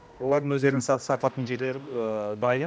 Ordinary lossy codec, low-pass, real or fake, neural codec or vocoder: none; none; fake; codec, 16 kHz, 1 kbps, X-Codec, HuBERT features, trained on general audio